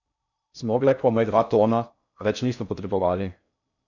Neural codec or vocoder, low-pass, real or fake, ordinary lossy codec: codec, 16 kHz in and 24 kHz out, 0.6 kbps, FocalCodec, streaming, 4096 codes; 7.2 kHz; fake; none